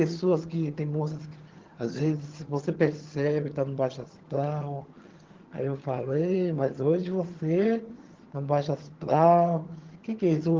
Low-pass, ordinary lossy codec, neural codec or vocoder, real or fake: 7.2 kHz; Opus, 16 kbps; vocoder, 22.05 kHz, 80 mel bands, HiFi-GAN; fake